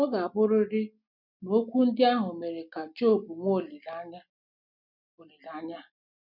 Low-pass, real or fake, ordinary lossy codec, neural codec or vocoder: 5.4 kHz; real; none; none